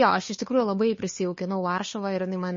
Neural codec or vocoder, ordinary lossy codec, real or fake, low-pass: codec, 16 kHz, 2 kbps, FunCodec, trained on Chinese and English, 25 frames a second; MP3, 32 kbps; fake; 7.2 kHz